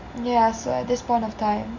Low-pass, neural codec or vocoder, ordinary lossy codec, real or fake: 7.2 kHz; none; none; real